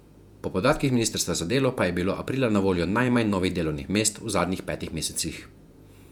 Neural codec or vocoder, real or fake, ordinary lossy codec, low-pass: vocoder, 48 kHz, 128 mel bands, Vocos; fake; none; 19.8 kHz